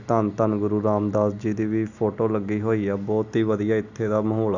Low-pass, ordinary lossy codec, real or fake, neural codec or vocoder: 7.2 kHz; none; real; none